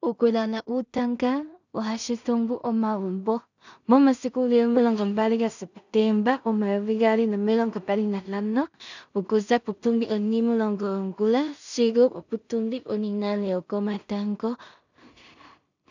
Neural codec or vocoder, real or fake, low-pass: codec, 16 kHz in and 24 kHz out, 0.4 kbps, LongCat-Audio-Codec, two codebook decoder; fake; 7.2 kHz